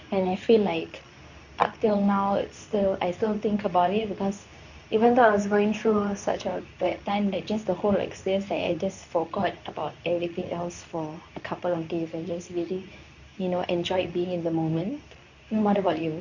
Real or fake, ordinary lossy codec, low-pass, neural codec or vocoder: fake; none; 7.2 kHz; codec, 24 kHz, 0.9 kbps, WavTokenizer, medium speech release version 2